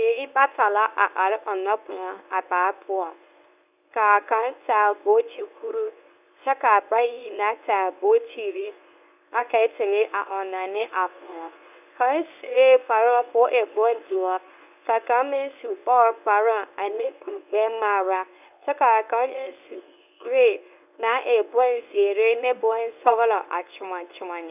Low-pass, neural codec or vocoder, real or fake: 3.6 kHz; codec, 24 kHz, 0.9 kbps, WavTokenizer, medium speech release version 1; fake